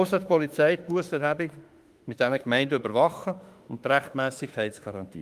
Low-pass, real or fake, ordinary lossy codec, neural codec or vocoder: 14.4 kHz; fake; Opus, 32 kbps; autoencoder, 48 kHz, 32 numbers a frame, DAC-VAE, trained on Japanese speech